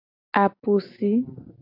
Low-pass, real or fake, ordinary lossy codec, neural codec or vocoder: 5.4 kHz; real; AAC, 48 kbps; none